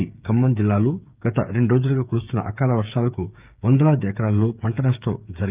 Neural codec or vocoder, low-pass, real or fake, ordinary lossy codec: codec, 16 kHz, 8 kbps, FreqCodec, smaller model; 3.6 kHz; fake; Opus, 24 kbps